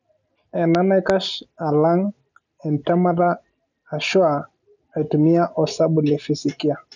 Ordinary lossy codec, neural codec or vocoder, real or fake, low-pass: none; none; real; 7.2 kHz